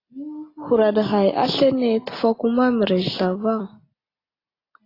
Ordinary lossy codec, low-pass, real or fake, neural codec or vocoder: AAC, 24 kbps; 5.4 kHz; real; none